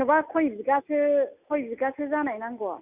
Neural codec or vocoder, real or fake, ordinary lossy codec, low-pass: none; real; none; 3.6 kHz